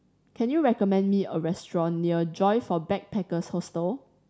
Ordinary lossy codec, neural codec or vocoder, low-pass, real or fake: none; none; none; real